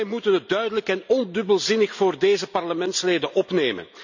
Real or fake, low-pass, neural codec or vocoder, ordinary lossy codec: real; 7.2 kHz; none; none